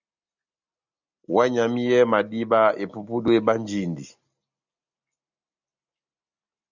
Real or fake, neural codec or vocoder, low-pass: real; none; 7.2 kHz